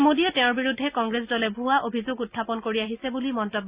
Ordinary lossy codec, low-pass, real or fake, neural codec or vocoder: Opus, 64 kbps; 3.6 kHz; real; none